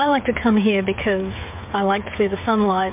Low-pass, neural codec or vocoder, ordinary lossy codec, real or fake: 3.6 kHz; codec, 16 kHz, 8 kbps, FreqCodec, smaller model; MP3, 32 kbps; fake